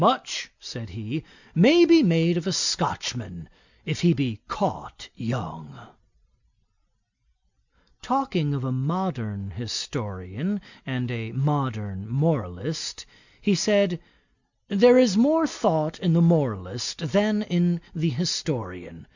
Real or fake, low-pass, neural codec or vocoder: real; 7.2 kHz; none